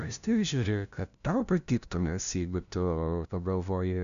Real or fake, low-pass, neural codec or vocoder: fake; 7.2 kHz; codec, 16 kHz, 0.5 kbps, FunCodec, trained on LibriTTS, 25 frames a second